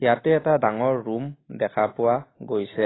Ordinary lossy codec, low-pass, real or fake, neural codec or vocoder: AAC, 16 kbps; 7.2 kHz; real; none